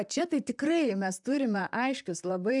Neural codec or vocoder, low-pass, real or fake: vocoder, 44.1 kHz, 128 mel bands, Pupu-Vocoder; 10.8 kHz; fake